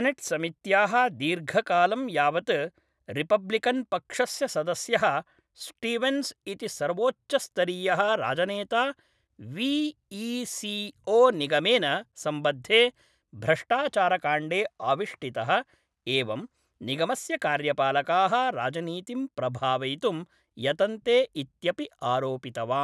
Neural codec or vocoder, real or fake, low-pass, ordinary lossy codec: none; real; none; none